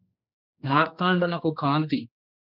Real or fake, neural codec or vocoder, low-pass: fake; codec, 16 kHz, 2 kbps, X-Codec, HuBERT features, trained on general audio; 5.4 kHz